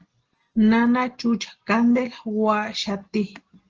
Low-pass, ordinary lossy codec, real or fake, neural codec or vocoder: 7.2 kHz; Opus, 16 kbps; real; none